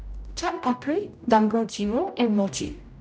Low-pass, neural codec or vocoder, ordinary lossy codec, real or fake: none; codec, 16 kHz, 0.5 kbps, X-Codec, HuBERT features, trained on general audio; none; fake